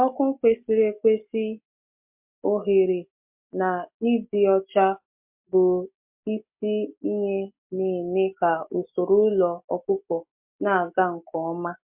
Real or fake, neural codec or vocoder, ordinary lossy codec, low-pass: real; none; MP3, 32 kbps; 3.6 kHz